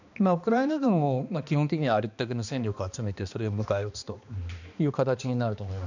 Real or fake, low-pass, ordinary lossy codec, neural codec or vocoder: fake; 7.2 kHz; none; codec, 16 kHz, 2 kbps, X-Codec, HuBERT features, trained on balanced general audio